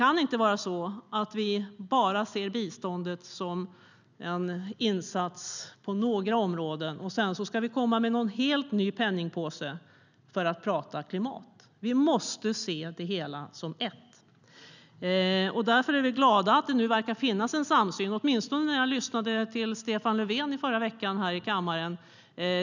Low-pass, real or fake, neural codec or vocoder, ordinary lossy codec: 7.2 kHz; real; none; none